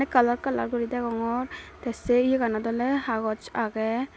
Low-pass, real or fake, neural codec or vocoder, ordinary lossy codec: none; real; none; none